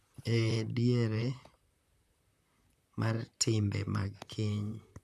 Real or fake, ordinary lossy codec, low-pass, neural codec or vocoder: fake; none; 14.4 kHz; vocoder, 44.1 kHz, 128 mel bands, Pupu-Vocoder